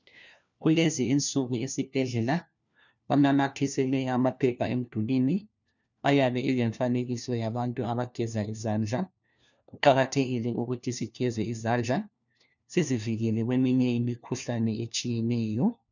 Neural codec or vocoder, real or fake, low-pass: codec, 16 kHz, 1 kbps, FunCodec, trained on LibriTTS, 50 frames a second; fake; 7.2 kHz